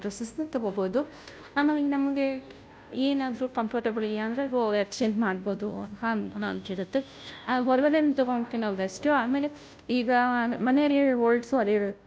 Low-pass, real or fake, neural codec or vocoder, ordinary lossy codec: none; fake; codec, 16 kHz, 0.5 kbps, FunCodec, trained on Chinese and English, 25 frames a second; none